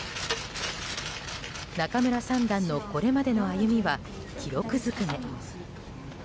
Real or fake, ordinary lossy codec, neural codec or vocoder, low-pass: real; none; none; none